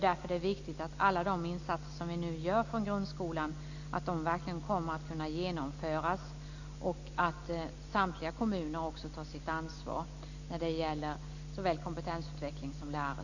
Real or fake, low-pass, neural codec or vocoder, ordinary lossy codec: real; 7.2 kHz; none; none